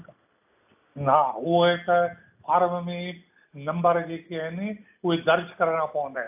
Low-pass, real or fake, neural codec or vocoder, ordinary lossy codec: 3.6 kHz; real; none; AAC, 32 kbps